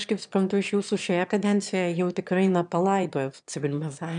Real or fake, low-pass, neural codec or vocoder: fake; 9.9 kHz; autoencoder, 22.05 kHz, a latent of 192 numbers a frame, VITS, trained on one speaker